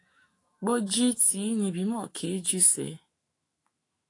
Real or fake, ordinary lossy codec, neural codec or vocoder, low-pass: fake; AAC, 48 kbps; autoencoder, 48 kHz, 128 numbers a frame, DAC-VAE, trained on Japanese speech; 10.8 kHz